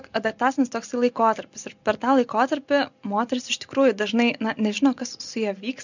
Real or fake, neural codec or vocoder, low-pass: real; none; 7.2 kHz